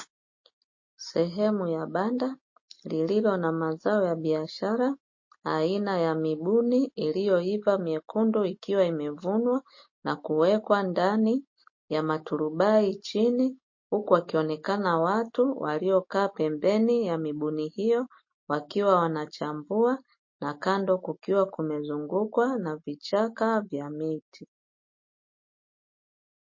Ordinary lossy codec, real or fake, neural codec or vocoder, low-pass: MP3, 32 kbps; real; none; 7.2 kHz